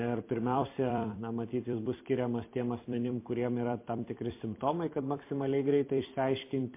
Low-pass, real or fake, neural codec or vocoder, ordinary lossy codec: 3.6 kHz; fake; vocoder, 44.1 kHz, 128 mel bands every 256 samples, BigVGAN v2; MP3, 24 kbps